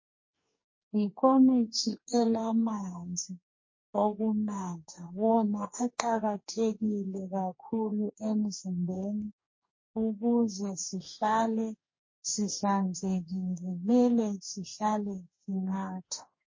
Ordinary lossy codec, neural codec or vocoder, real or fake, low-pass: MP3, 32 kbps; codec, 44.1 kHz, 2.6 kbps, DAC; fake; 7.2 kHz